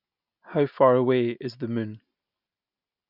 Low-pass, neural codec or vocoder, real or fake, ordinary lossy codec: 5.4 kHz; none; real; none